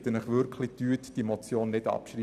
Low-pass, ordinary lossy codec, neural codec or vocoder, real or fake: 14.4 kHz; none; vocoder, 44.1 kHz, 128 mel bands every 256 samples, BigVGAN v2; fake